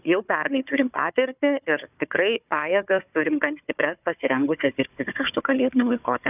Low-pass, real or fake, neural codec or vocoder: 3.6 kHz; fake; codec, 16 kHz, 4 kbps, FunCodec, trained on Chinese and English, 50 frames a second